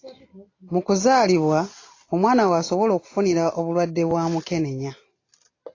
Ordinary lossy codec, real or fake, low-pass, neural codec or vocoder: AAC, 32 kbps; real; 7.2 kHz; none